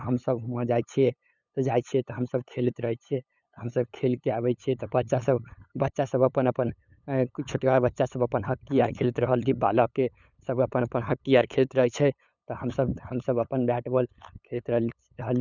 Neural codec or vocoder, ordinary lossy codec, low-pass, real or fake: codec, 16 kHz, 8 kbps, FunCodec, trained on LibriTTS, 25 frames a second; none; 7.2 kHz; fake